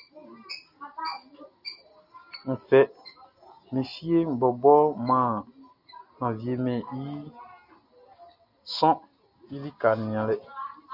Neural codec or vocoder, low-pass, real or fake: none; 5.4 kHz; real